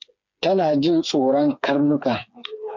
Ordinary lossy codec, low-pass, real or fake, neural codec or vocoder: MP3, 64 kbps; 7.2 kHz; fake; codec, 16 kHz, 4 kbps, FreqCodec, smaller model